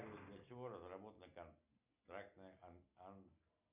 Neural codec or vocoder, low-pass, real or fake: none; 3.6 kHz; real